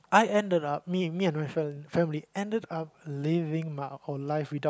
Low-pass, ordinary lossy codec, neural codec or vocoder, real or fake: none; none; none; real